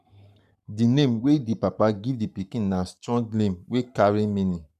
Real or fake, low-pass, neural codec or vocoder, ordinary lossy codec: fake; 14.4 kHz; codec, 44.1 kHz, 7.8 kbps, Pupu-Codec; none